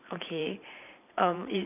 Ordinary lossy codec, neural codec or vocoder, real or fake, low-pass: none; vocoder, 44.1 kHz, 128 mel bands every 512 samples, BigVGAN v2; fake; 3.6 kHz